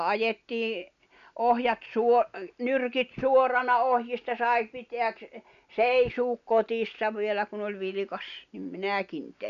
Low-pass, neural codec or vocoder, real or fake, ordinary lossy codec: 7.2 kHz; none; real; none